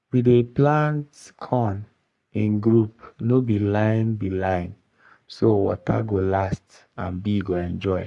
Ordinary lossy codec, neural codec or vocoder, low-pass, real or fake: Opus, 64 kbps; codec, 44.1 kHz, 3.4 kbps, Pupu-Codec; 10.8 kHz; fake